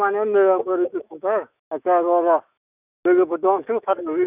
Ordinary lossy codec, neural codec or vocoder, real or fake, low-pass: none; autoencoder, 48 kHz, 128 numbers a frame, DAC-VAE, trained on Japanese speech; fake; 3.6 kHz